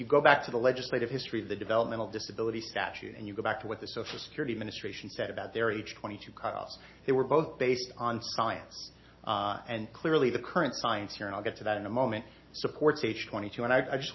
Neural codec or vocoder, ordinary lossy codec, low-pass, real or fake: none; MP3, 24 kbps; 7.2 kHz; real